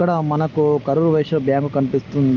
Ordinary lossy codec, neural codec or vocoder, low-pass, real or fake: Opus, 24 kbps; none; 7.2 kHz; real